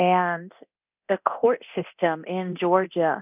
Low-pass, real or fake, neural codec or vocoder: 3.6 kHz; fake; codec, 24 kHz, 0.9 kbps, DualCodec